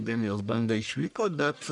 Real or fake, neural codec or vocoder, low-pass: fake; codec, 44.1 kHz, 1.7 kbps, Pupu-Codec; 10.8 kHz